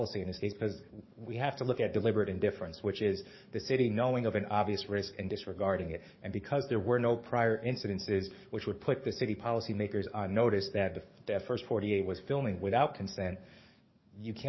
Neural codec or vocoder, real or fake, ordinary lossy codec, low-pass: codec, 44.1 kHz, 7.8 kbps, DAC; fake; MP3, 24 kbps; 7.2 kHz